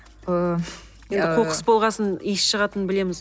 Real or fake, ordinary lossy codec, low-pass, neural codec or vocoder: real; none; none; none